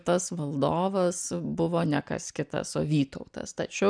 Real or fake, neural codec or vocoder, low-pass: real; none; 9.9 kHz